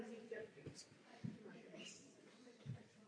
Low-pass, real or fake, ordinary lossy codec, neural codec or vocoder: 9.9 kHz; fake; AAC, 48 kbps; vocoder, 22.05 kHz, 80 mel bands, WaveNeXt